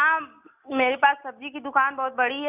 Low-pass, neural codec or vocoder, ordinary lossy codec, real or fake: 3.6 kHz; none; none; real